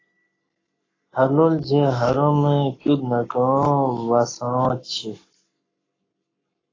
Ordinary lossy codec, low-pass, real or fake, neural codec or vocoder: AAC, 32 kbps; 7.2 kHz; fake; codec, 44.1 kHz, 7.8 kbps, Pupu-Codec